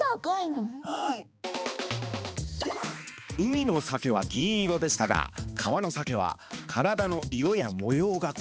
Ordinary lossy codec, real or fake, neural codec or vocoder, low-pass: none; fake; codec, 16 kHz, 2 kbps, X-Codec, HuBERT features, trained on balanced general audio; none